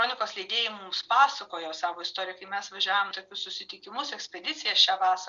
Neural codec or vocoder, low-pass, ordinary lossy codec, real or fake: none; 7.2 kHz; Opus, 64 kbps; real